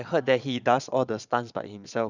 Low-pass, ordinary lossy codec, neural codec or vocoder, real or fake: 7.2 kHz; none; vocoder, 22.05 kHz, 80 mel bands, Vocos; fake